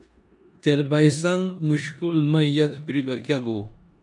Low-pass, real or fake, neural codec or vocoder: 10.8 kHz; fake; codec, 16 kHz in and 24 kHz out, 0.9 kbps, LongCat-Audio-Codec, four codebook decoder